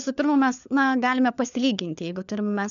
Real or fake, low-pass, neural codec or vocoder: fake; 7.2 kHz; codec, 16 kHz, 4 kbps, FunCodec, trained on LibriTTS, 50 frames a second